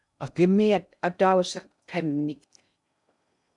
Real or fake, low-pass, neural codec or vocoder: fake; 10.8 kHz; codec, 16 kHz in and 24 kHz out, 0.6 kbps, FocalCodec, streaming, 2048 codes